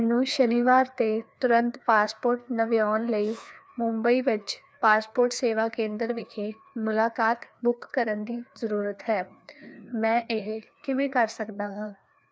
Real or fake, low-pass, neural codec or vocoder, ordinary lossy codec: fake; none; codec, 16 kHz, 2 kbps, FreqCodec, larger model; none